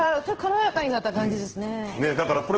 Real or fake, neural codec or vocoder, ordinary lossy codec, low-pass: fake; codec, 16 kHz in and 24 kHz out, 1 kbps, XY-Tokenizer; Opus, 16 kbps; 7.2 kHz